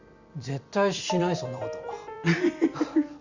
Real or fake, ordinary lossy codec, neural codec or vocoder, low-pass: fake; Opus, 64 kbps; vocoder, 44.1 kHz, 128 mel bands every 256 samples, BigVGAN v2; 7.2 kHz